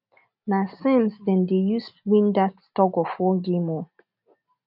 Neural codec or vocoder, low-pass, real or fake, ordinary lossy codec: none; 5.4 kHz; real; none